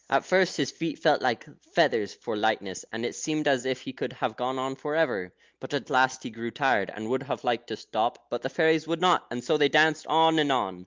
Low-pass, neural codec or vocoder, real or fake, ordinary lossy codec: 7.2 kHz; none; real; Opus, 24 kbps